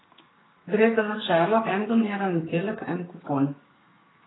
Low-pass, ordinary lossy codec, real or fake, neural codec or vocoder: 7.2 kHz; AAC, 16 kbps; fake; codec, 32 kHz, 1.9 kbps, SNAC